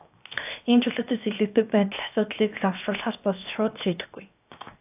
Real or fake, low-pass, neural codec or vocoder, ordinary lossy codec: fake; 3.6 kHz; codec, 16 kHz, 0.7 kbps, FocalCodec; AAC, 32 kbps